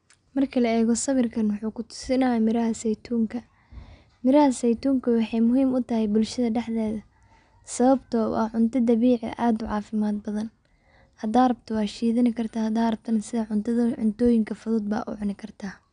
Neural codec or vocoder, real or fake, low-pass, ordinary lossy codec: none; real; 9.9 kHz; none